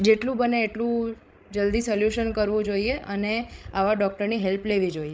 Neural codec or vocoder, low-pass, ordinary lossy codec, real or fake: codec, 16 kHz, 16 kbps, FreqCodec, larger model; none; none; fake